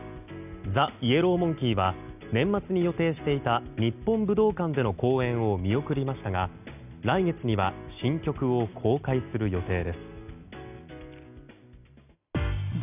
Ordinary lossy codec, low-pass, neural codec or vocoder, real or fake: none; 3.6 kHz; none; real